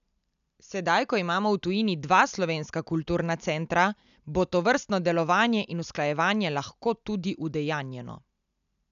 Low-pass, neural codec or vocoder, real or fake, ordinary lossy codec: 7.2 kHz; none; real; none